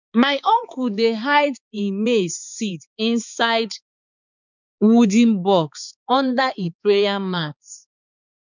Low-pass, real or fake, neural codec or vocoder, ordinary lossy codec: 7.2 kHz; fake; codec, 16 kHz, 4 kbps, X-Codec, HuBERT features, trained on balanced general audio; none